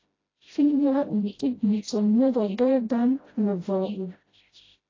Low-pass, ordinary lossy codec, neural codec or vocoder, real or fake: 7.2 kHz; AAC, 32 kbps; codec, 16 kHz, 0.5 kbps, FreqCodec, smaller model; fake